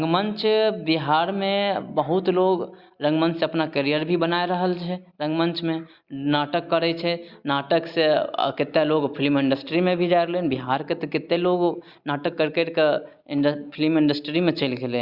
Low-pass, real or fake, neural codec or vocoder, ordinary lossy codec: 5.4 kHz; real; none; Opus, 64 kbps